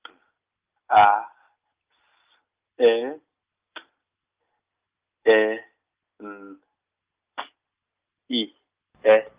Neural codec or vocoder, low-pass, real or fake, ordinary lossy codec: none; 3.6 kHz; real; Opus, 24 kbps